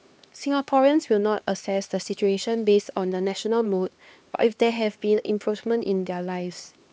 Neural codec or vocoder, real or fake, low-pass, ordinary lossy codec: codec, 16 kHz, 2 kbps, X-Codec, HuBERT features, trained on LibriSpeech; fake; none; none